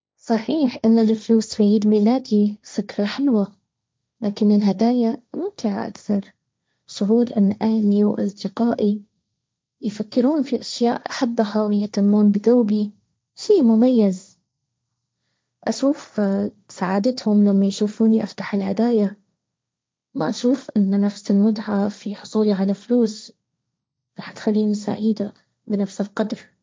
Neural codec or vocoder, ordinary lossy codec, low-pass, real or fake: codec, 16 kHz, 1.1 kbps, Voila-Tokenizer; none; none; fake